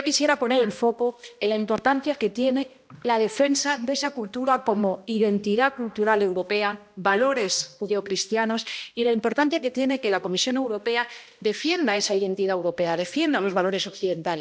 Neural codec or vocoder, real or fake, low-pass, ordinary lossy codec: codec, 16 kHz, 1 kbps, X-Codec, HuBERT features, trained on balanced general audio; fake; none; none